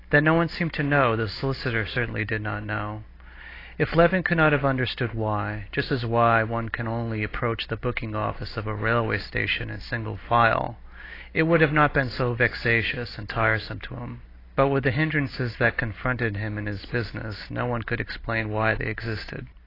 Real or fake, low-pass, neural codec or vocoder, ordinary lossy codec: real; 5.4 kHz; none; AAC, 24 kbps